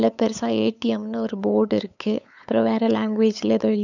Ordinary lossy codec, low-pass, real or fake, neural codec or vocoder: none; 7.2 kHz; fake; codec, 16 kHz, 8 kbps, FunCodec, trained on LibriTTS, 25 frames a second